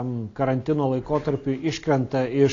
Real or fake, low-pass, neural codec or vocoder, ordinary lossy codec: real; 7.2 kHz; none; AAC, 32 kbps